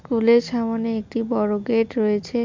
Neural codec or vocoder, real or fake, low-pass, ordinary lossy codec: none; real; 7.2 kHz; MP3, 64 kbps